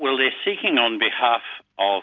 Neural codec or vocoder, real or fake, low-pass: none; real; 7.2 kHz